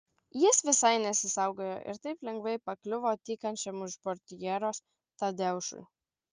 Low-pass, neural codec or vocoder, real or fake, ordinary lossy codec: 7.2 kHz; none; real; Opus, 24 kbps